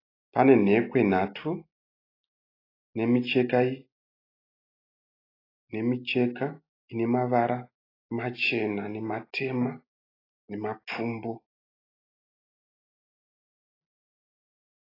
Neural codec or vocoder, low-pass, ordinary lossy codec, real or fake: none; 5.4 kHz; AAC, 24 kbps; real